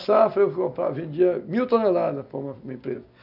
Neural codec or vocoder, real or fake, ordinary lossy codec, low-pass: none; real; none; 5.4 kHz